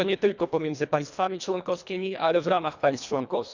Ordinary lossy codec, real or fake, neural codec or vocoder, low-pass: none; fake; codec, 24 kHz, 1.5 kbps, HILCodec; 7.2 kHz